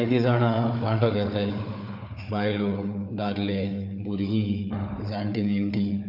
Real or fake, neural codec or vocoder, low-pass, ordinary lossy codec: fake; codec, 16 kHz, 4 kbps, FunCodec, trained on Chinese and English, 50 frames a second; 5.4 kHz; none